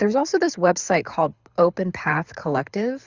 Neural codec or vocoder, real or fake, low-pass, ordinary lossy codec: codec, 24 kHz, 6 kbps, HILCodec; fake; 7.2 kHz; Opus, 64 kbps